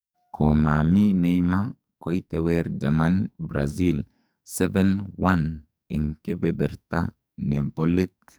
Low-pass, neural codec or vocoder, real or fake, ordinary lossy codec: none; codec, 44.1 kHz, 2.6 kbps, SNAC; fake; none